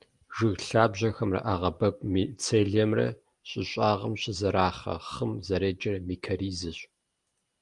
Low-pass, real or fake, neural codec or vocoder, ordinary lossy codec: 10.8 kHz; real; none; Opus, 32 kbps